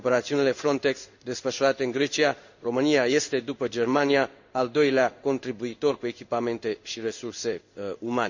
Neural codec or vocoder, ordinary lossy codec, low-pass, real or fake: codec, 16 kHz in and 24 kHz out, 1 kbps, XY-Tokenizer; none; 7.2 kHz; fake